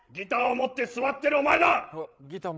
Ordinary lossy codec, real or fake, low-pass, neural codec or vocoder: none; fake; none; codec, 16 kHz, 8 kbps, FreqCodec, larger model